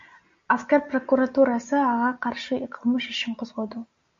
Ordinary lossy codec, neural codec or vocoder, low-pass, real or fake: MP3, 48 kbps; none; 7.2 kHz; real